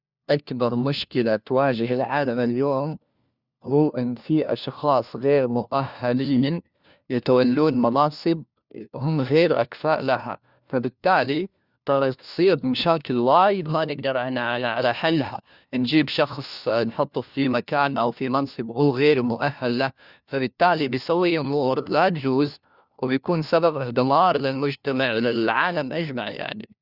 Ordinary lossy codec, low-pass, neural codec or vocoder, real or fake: Opus, 64 kbps; 5.4 kHz; codec, 16 kHz, 1 kbps, FunCodec, trained on LibriTTS, 50 frames a second; fake